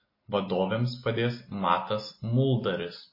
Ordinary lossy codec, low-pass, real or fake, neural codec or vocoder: MP3, 24 kbps; 5.4 kHz; real; none